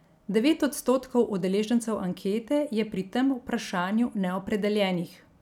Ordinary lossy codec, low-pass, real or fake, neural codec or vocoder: none; 19.8 kHz; real; none